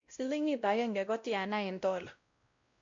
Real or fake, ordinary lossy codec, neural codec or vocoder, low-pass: fake; MP3, 48 kbps; codec, 16 kHz, 0.5 kbps, X-Codec, WavLM features, trained on Multilingual LibriSpeech; 7.2 kHz